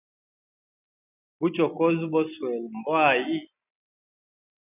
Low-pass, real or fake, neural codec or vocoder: 3.6 kHz; real; none